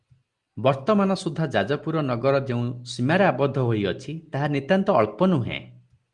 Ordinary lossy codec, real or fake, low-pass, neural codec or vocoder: Opus, 24 kbps; real; 10.8 kHz; none